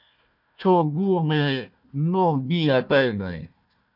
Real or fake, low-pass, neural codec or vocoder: fake; 5.4 kHz; codec, 16 kHz, 1 kbps, FunCodec, trained on Chinese and English, 50 frames a second